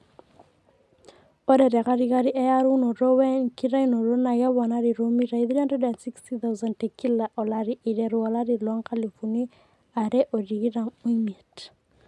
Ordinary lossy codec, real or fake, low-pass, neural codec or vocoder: none; real; none; none